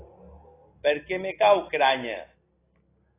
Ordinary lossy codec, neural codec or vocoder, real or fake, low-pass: AAC, 16 kbps; none; real; 3.6 kHz